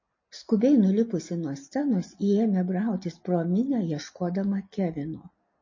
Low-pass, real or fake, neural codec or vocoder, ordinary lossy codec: 7.2 kHz; real; none; MP3, 32 kbps